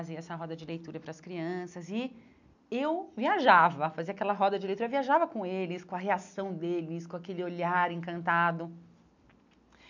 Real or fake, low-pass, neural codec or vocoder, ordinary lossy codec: real; 7.2 kHz; none; none